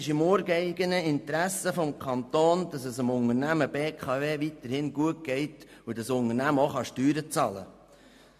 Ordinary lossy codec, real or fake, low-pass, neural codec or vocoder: MP3, 64 kbps; real; 14.4 kHz; none